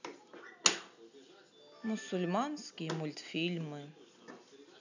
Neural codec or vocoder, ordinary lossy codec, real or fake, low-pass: none; none; real; 7.2 kHz